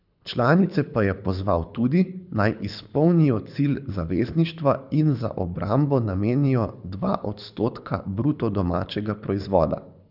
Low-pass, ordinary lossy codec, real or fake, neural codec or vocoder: 5.4 kHz; none; fake; codec, 24 kHz, 6 kbps, HILCodec